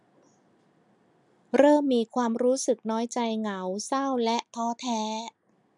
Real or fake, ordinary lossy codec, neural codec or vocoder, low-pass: real; MP3, 96 kbps; none; 10.8 kHz